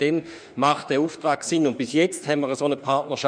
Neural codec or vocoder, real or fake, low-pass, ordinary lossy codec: autoencoder, 48 kHz, 32 numbers a frame, DAC-VAE, trained on Japanese speech; fake; 9.9 kHz; MP3, 96 kbps